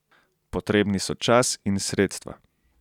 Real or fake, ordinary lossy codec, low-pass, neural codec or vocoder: real; none; 19.8 kHz; none